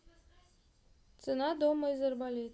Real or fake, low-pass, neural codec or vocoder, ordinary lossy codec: real; none; none; none